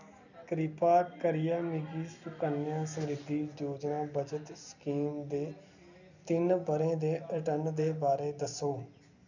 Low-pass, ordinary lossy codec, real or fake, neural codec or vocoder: 7.2 kHz; none; real; none